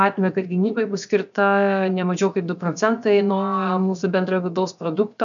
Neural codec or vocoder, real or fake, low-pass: codec, 16 kHz, 0.7 kbps, FocalCodec; fake; 7.2 kHz